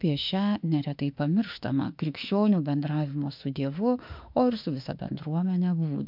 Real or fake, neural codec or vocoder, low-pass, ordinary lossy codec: fake; autoencoder, 48 kHz, 32 numbers a frame, DAC-VAE, trained on Japanese speech; 5.4 kHz; MP3, 48 kbps